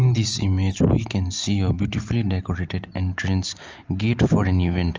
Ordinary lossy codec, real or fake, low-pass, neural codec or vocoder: Opus, 24 kbps; real; 7.2 kHz; none